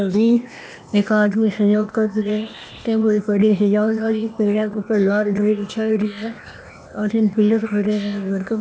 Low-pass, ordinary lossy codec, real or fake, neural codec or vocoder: none; none; fake; codec, 16 kHz, 0.8 kbps, ZipCodec